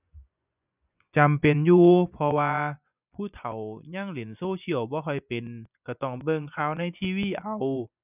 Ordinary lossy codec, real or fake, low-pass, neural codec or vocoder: none; fake; 3.6 kHz; vocoder, 24 kHz, 100 mel bands, Vocos